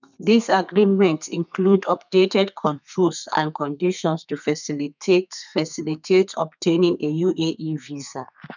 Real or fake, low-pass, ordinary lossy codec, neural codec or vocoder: fake; 7.2 kHz; none; codec, 32 kHz, 1.9 kbps, SNAC